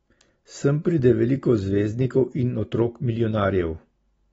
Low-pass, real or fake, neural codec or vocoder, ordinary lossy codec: 19.8 kHz; real; none; AAC, 24 kbps